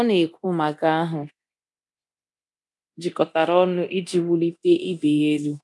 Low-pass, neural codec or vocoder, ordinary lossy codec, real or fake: none; codec, 24 kHz, 0.9 kbps, DualCodec; none; fake